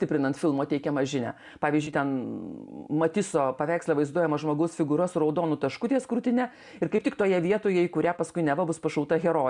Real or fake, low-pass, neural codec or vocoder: real; 10.8 kHz; none